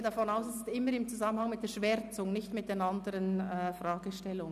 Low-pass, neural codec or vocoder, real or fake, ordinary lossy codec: 14.4 kHz; none; real; none